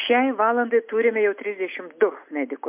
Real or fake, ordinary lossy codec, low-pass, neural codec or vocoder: real; MP3, 32 kbps; 3.6 kHz; none